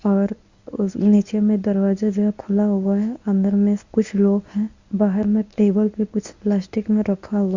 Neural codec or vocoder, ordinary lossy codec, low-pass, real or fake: codec, 24 kHz, 0.9 kbps, WavTokenizer, medium speech release version 1; Opus, 64 kbps; 7.2 kHz; fake